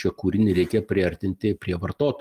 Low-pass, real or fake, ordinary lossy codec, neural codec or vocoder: 14.4 kHz; real; Opus, 32 kbps; none